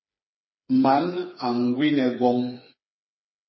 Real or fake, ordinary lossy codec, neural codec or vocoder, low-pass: fake; MP3, 24 kbps; codec, 16 kHz, 4 kbps, FreqCodec, smaller model; 7.2 kHz